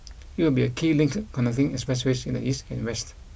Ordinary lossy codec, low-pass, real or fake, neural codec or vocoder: none; none; real; none